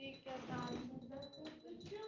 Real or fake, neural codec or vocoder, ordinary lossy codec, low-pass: real; none; Opus, 32 kbps; 7.2 kHz